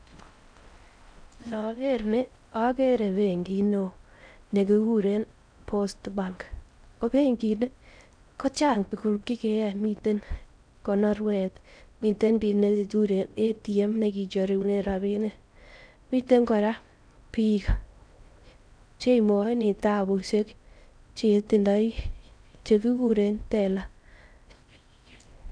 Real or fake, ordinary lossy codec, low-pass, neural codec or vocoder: fake; none; 9.9 kHz; codec, 16 kHz in and 24 kHz out, 0.6 kbps, FocalCodec, streaming, 4096 codes